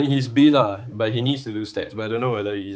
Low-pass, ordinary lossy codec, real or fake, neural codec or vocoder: none; none; fake; codec, 16 kHz, 4 kbps, X-Codec, HuBERT features, trained on balanced general audio